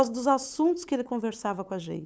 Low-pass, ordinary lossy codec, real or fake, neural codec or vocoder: none; none; fake; codec, 16 kHz, 8 kbps, FunCodec, trained on LibriTTS, 25 frames a second